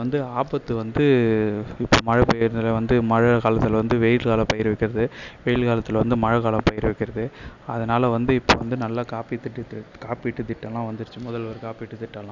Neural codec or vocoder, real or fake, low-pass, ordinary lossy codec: none; real; 7.2 kHz; none